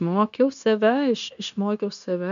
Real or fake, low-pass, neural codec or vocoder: fake; 7.2 kHz; codec, 16 kHz, 0.9 kbps, LongCat-Audio-Codec